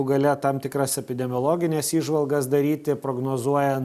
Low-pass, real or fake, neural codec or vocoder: 14.4 kHz; real; none